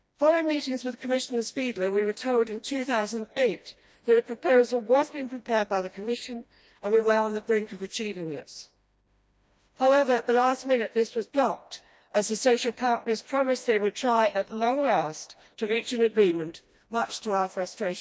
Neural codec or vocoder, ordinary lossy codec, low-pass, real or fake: codec, 16 kHz, 1 kbps, FreqCodec, smaller model; none; none; fake